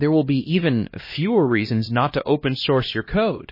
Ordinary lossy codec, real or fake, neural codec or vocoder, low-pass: MP3, 24 kbps; fake; codec, 16 kHz, 1 kbps, X-Codec, HuBERT features, trained on LibriSpeech; 5.4 kHz